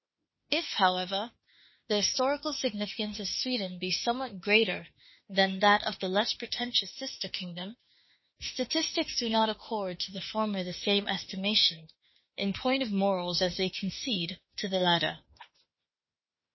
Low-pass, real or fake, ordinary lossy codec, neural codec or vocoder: 7.2 kHz; fake; MP3, 24 kbps; autoencoder, 48 kHz, 32 numbers a frame, DAC-VAE, trained on Japanese speech